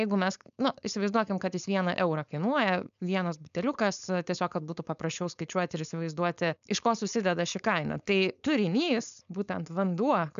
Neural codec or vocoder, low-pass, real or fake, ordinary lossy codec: codec, 16 kHz, 4.8 kbps, FACodec; 7.2 kHz; fake; MP3, 96 kbps